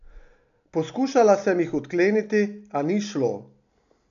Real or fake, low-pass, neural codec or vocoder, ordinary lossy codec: real; 7.2 kHz; none; none